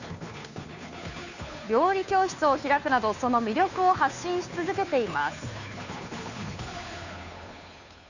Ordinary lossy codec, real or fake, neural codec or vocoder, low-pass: none; fake; codec, 16 kHz, 2 kbps, FunCodec, trained on Chinese and English, 25 frames a second; 7.2 kHz